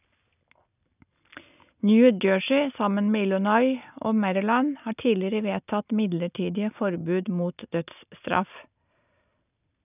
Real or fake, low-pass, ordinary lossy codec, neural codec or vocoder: real; 3.6 kHz; none; none